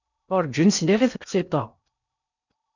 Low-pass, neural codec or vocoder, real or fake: 7.2 kHz; codec, 16 kHz in and 24 kHz out, 0.8 kbps, FocalCodec, streaming, 65536 codes; fake